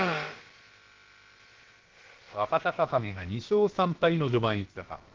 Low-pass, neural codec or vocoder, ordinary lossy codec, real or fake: 7.2 kHz; codec, 16 kHz, about 1 kbps, DyCAST, with the encoder's durations; Opus, 16 kbps; fake